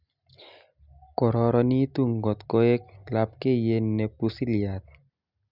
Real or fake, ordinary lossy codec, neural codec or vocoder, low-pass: real; none; none; 5.4 kHz